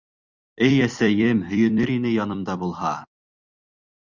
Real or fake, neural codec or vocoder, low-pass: fake; vocoder, 44.1 kHz, 128 mel bands every 256 samples, BigVGAN v2; 7.2 kHz